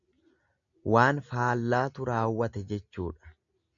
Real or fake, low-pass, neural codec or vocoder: real; 7.2 kHz; none